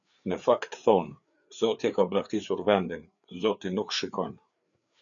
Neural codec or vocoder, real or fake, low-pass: codec, 16 kHz, 4 kbps, FreqCodec, larger model; fake; 7.2 kHz